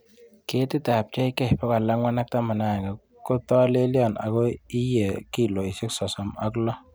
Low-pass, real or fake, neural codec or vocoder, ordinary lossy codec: none; real; none; none